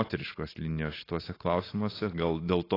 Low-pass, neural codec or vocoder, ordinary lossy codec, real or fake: 5.4 kHz; none; AAC, 24 kbps; real